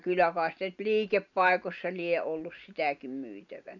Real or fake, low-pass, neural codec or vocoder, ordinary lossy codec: real; 7.2 kHz; none; none